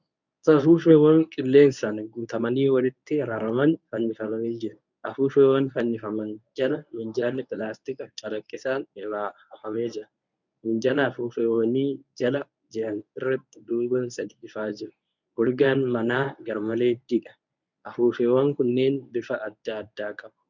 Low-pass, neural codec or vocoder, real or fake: 7.2 kHz; codec, 24 kHz, 0.9 kbps, WavTokenizer, medium speech release version 1; fake